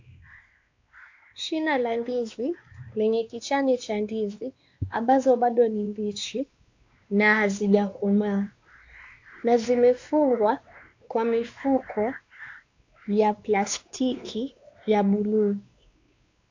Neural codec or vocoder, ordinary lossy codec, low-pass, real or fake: codec, 16 kHz, 2 kbps, X-Codec, WavLM features, trained on Multilingual LibriSpeech; AAC, 48 kbps; 7.2 kHz; fake